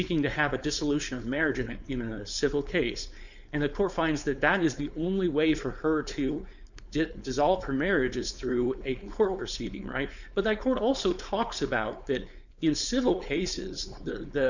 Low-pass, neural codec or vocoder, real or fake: 7.2 kHz; codec, 16 kHz, 4.8 kbps, FACodec; fake